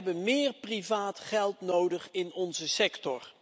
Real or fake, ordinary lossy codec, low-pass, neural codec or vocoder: real; none; none; none